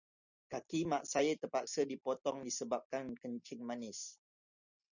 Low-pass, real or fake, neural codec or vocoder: 7.2 kHz; real; none